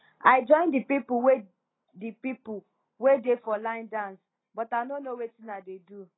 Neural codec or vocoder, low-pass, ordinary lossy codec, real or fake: none; 7.2 kHz; AAC, 16 kbps; real